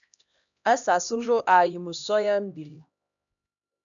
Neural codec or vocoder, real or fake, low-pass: codec, 16 kHz, 1 kbps, X-Codec, HuBERT features, trained on LibriSpeech; fake; 7.2 kHz